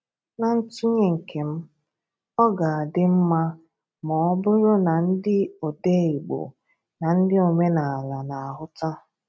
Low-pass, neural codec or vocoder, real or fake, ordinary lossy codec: none; none; real; none